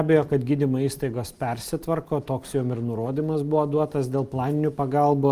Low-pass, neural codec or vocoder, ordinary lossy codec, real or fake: 14.4 kHz; none; Opus, 32 kbps; real